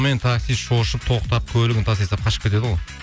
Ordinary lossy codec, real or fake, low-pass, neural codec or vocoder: none; real; none; none